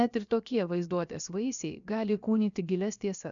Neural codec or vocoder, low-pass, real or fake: codec, 16 kHz, 0.7 kbps, FocalCodec; 7.2 kHz; fake